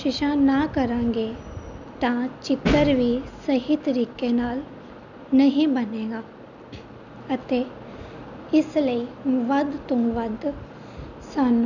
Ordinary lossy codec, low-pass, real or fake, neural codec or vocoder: none; 7.2 kHz; real; none